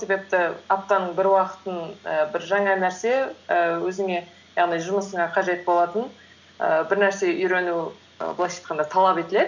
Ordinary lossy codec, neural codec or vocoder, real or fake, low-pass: none; none; real; 7.2 kHz